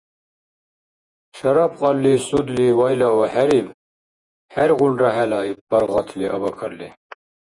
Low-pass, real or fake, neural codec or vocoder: 10.8 kHz; fake; vocoder, 48 kHz, 128 mel bands, Vocos